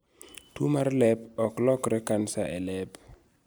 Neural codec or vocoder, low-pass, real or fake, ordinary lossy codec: none; none; real; none